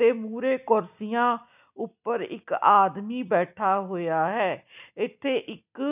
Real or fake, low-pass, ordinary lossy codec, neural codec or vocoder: real; 3.6 kHz; none; none